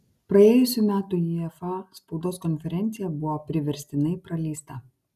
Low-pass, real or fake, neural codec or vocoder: 14.4 kHz; real; none